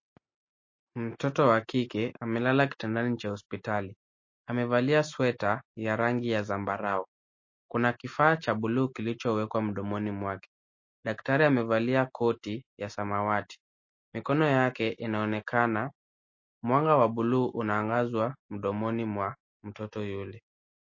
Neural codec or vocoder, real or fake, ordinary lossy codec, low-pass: none; real; MP3, 32 kbps; 7.2 kHz